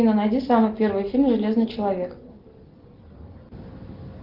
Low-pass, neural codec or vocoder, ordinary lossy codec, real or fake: 5.4 kHz; none; Opus, 16 kbps; real